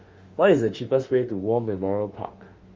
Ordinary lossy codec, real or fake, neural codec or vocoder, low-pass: Opus, 32 kbps; fake; autoencoder, 48 kHz, 32 numbers a frame, DAC-VAE, trained on Japanese speech; 7.2 kHz